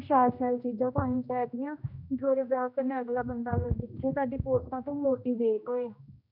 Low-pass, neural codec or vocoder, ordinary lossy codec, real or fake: 5.4 kHz; codec, 16 kHz, 1 kbps, X-Codec, HuBERT features, trained on general audio; none; fake